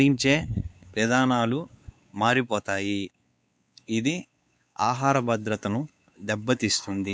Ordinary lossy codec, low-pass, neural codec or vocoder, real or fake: none; none; codec, 16 kHz, 4 kbps, X-Codec, WavLM features, trained on Multilingual LibriSpeech; fake